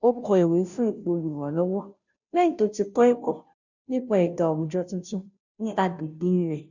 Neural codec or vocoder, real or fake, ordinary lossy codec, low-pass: codec, 16 kHz, 0.5 kbps, FunCodec, trained on Chinese and English, 25 frames a second; fake; none; 7.2 kHz